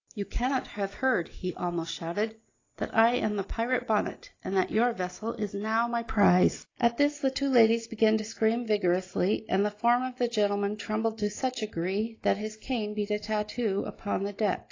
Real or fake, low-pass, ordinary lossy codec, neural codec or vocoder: real; 7.2 kHz; AAC, 32 kbps; none